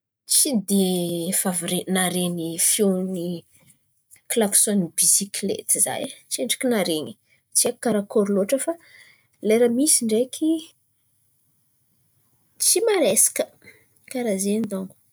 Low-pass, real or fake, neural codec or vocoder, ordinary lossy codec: none; real; none; none